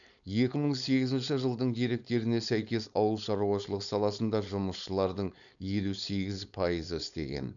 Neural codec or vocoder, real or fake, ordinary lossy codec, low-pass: codec, 16 kHz, 4.8 kbps, FACodec; fake; none; 7.2 kHz